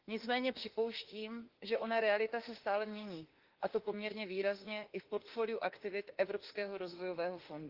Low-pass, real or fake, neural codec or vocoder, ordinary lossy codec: 5.4 kHz; fake; autoencoder, 48 kHz, 32 numbers a frame, DAC-VAE, trained on Japanese speech; Opus, 16 kbps